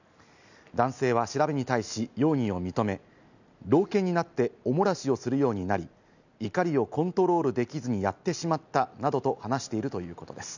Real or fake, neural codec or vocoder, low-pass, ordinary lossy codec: real; none; 7.2 kHz; none